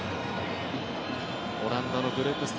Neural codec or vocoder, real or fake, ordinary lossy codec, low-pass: none; real; none; none